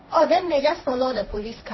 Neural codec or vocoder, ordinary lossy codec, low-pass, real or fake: codec, 16 kHz, 1.1 kbps, Voila-Tokenizer; MP3, 24 kbps; 7.2 kHz; fake